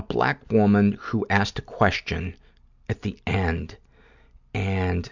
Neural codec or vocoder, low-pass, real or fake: none; 7.2 kHz; real